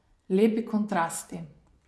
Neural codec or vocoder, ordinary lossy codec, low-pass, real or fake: vocoder, 24 kHz, 100 mel bands, Vocos; none; none; fake